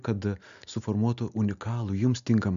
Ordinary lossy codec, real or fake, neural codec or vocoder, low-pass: MP3, 96 kbps; real; none; 7.2 kHz